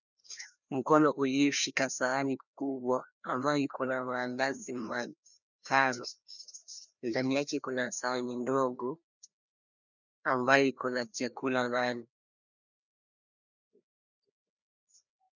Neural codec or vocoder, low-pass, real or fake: codec, 16 kHz, 1 kbps, FreqCodec, larger model; 7.2 kHz; fake